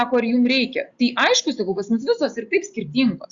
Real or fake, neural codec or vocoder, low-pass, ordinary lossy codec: real; none; 7.2 kHz; Opus, 64 kbps